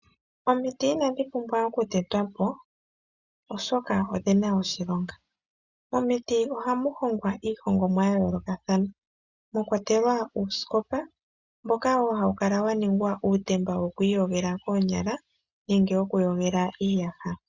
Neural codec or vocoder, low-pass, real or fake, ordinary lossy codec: none; 7.2 kHz; real; Opus, 64 kbps